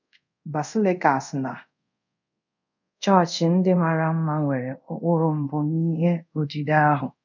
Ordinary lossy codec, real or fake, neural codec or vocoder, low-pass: none; fake; codec, 24 kHz, 0.5 kbps, DualCodec; 7.2 kHz